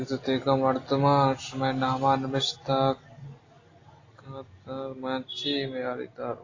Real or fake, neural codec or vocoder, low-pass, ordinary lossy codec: real; none; 7.2 kHz; AAC, 32 kbps